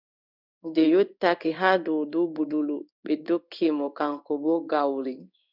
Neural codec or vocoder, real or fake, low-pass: codec, 16 kHz in and 24 kHz out, 1 kbps, XY-Tokenizer; fake; 5.4 kHz